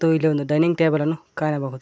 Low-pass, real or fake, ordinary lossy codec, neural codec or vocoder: none; real; none; none